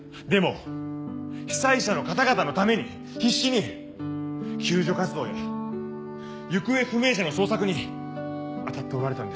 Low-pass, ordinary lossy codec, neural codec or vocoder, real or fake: none; none; none; real